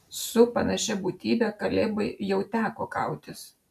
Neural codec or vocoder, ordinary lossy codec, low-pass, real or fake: none; MP3, 96 kbps; 14.4 kHz; real